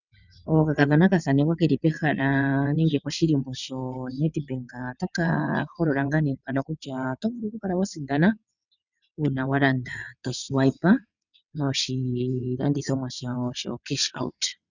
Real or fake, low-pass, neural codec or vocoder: fake; 7.2 kHz; vocoder, 22.05 kHz, 80 mel bands, WaveNeXt